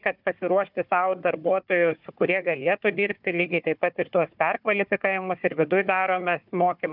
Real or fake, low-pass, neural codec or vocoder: fake; 5.4 kHz; codec, 16 kHz, 4 kbps, FunCodec, trained on Chinese and English, 50 frames a second